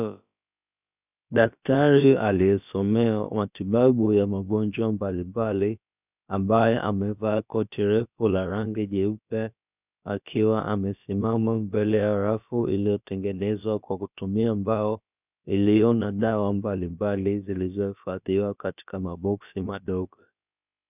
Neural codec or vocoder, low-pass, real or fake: codec, 16 kHz, about 1 kbps, DyCAST, with the encoder's durations; 3.6 kHz; fake